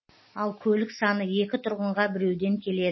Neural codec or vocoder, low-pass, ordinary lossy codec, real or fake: none; 7.2 kHz; MP3, 24 kbps; real